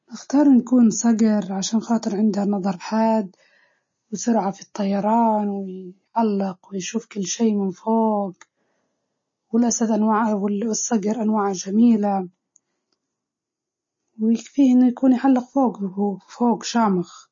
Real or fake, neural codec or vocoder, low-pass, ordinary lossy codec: real; none; 7.2 kHz; MP3, 32 kbps